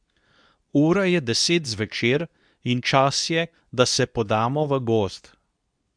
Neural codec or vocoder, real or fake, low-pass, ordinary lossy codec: codec, 24 kHz, 0.9 kbps, WavTokenizer, medium speech release version 2; fake; 9.9 kHz; none